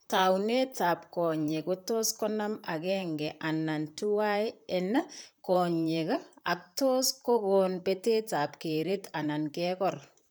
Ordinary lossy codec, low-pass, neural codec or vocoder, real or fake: none; none; vocoder, 44.1 kHz, 128 mel bands, Pupu-Vocoder; fake